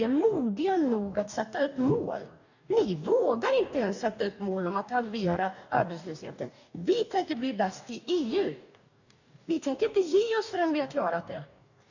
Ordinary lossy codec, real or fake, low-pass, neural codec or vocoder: none; fake; 7.2 kHz; codec, 44.1 kHz, 2.6 kbps, DAC